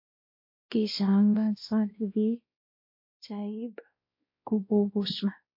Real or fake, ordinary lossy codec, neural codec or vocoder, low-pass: fake; MP3, 32 kbps; codec, 16 kHz, 1 kbps, X-Codec, WavLM features, trained on Multilingual LibriSpeech; 5.4 kHz